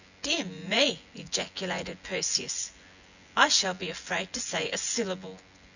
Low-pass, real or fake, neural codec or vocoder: 7.2 kHz; fake; vocoder, 24 kHz, 100 mel bands, Vocos